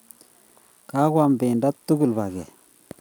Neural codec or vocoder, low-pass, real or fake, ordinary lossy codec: none; none; real; none